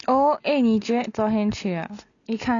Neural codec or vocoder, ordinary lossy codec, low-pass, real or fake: none; none; 7.2 kHz; real